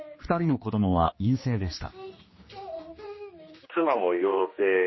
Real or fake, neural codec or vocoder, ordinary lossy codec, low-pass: fake; codec, 16 kHz, 2 kbps, X-Codec, HuBERT features, trained on general audio; MP3, 24 kbps; 7.2 kHz